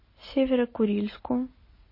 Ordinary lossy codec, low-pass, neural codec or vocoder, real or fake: MP3, 24 kbps; 5.4 kHz; none; real